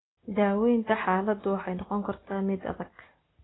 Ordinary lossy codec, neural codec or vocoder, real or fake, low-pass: AAC, 16 kbps; none; real; 7.2 kHz